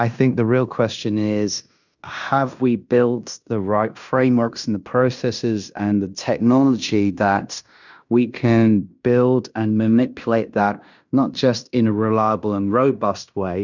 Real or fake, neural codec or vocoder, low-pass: fake; codec, 16 kHz in and 24 kHz out, 0.9 kbps, LongCat-Audio-Codec, fine tuned four codebook decoder; 7.2 kHz